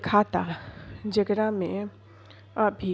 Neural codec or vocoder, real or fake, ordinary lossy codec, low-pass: none; real; none; none